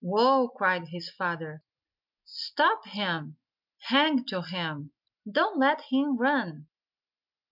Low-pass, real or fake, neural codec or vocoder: 5.4 kHz; real; none